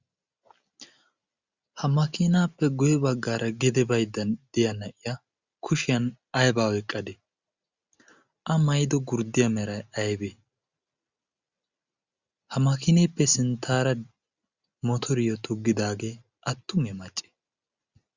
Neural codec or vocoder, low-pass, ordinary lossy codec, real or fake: none; 7.2 kHz; Opus, 64 kbps; real